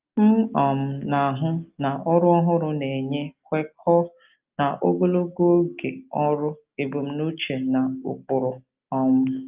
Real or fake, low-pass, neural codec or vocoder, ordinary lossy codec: real; 3.6 kHz; none; Opus, 24 kbps